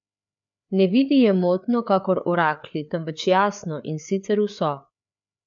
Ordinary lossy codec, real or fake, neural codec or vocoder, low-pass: MP3, 64 kbps; fake; codec, 16 kHz, 4 kbps, FreqCodec, larger model; 7.2 kHz